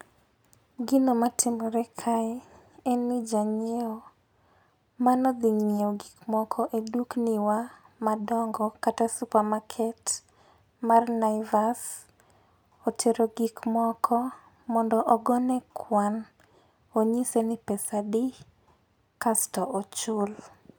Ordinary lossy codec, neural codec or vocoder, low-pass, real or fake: none; none; none; real